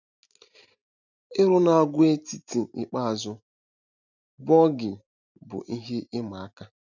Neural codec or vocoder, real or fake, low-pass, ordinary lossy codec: none; real; 7.2 kHz; none